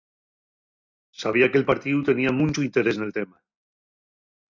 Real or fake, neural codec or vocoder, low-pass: real; none; 7.2 kHz